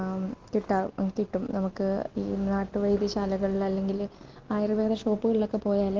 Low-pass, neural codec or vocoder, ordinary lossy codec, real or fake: 7.2 kHz; none; Opus, 32 kbps; real